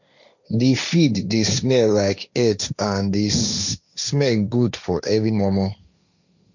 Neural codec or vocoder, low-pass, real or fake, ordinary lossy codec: codec, 16 kHz, 1.1 kbps, Voila-Tokenizer; 7.2 kHz; fake; none